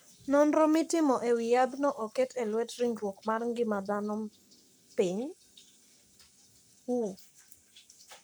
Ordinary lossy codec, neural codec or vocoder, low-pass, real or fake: none; codec, 44.1 kHz, 7.8 kbps, Pupu-Codec; none; fake